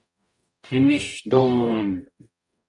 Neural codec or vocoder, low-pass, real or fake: codec, 44.1 kHz, 0.9 kbps, DAC; 10.8 kHz; fake